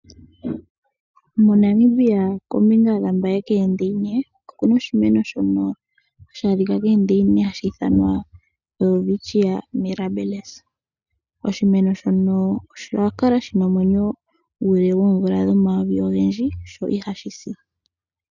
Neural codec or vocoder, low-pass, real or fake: none; 7.2 kHz; real